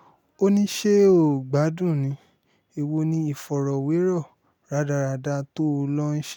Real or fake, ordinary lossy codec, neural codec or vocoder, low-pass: real; none; none; 19.8 kHz